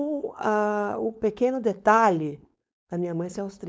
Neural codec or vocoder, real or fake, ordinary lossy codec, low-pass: codec, 16 kHz, 4.8 kbps, FACodec; fake; none; none